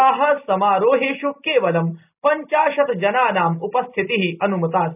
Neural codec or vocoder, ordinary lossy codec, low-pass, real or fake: none; none; 3.6 kHz; real